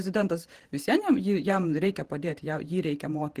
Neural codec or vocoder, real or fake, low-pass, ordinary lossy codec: vocoder, 48 kHz, 128 mel bands, Vocos; fake; 14.4 kHz; Opus, 16 kbps